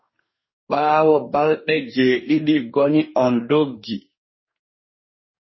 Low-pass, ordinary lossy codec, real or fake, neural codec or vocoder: 7.2 kHz; MP3, 24 kbps; fake; codec, 44.1 kHz, 2.6 kbps, DAC